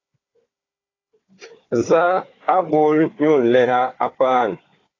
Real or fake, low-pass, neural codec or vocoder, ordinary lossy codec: fake; 7.2 kHz; codec, 16 kHz, 4 kbps, FunCodec, trained on Chinese and English, 50 frames a second; AAC, 32 kbps